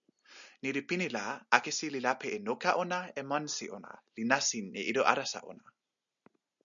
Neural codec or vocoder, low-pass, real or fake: none; 7.2 kHz; real